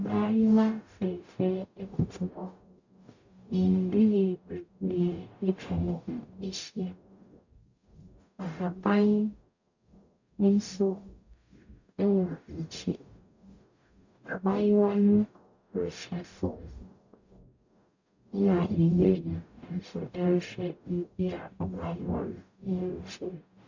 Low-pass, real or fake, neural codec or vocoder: 7.2 kHz; fake; codec, 44.1 kHz, 0.9 kbps, DAC